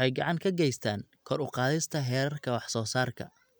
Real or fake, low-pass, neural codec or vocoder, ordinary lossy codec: real; none; none; none